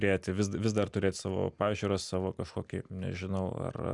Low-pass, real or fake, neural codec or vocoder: 10.8 kHz; real; none